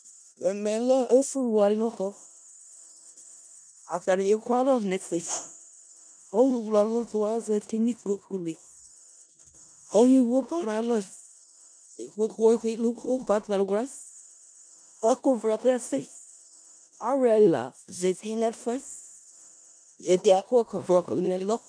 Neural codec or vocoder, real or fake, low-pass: codec, 16 kHz in and 24 kHz out, 0.4 kbps, LongCat-Audio-Codec, four codebook decoder; fake; 9.9 kHz